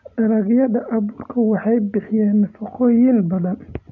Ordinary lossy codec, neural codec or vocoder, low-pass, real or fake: none; none; 7.2 kHz; real